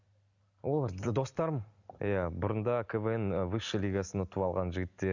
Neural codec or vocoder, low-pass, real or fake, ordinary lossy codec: none; 7.2 kHz; real; none